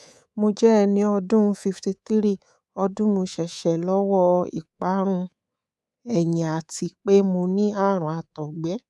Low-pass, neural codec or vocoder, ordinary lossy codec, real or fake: none; codec, 24 kHz, 3.1 kbps, DualCodec; none; fake